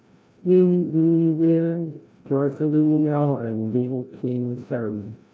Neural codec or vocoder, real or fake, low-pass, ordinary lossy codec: codec, 16 kHz, 0.5 kbps, FreqCodec, larger model; fake; none; none